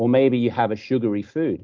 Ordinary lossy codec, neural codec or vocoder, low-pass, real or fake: Opus, 24 kbps; none; 7.2 kHz; real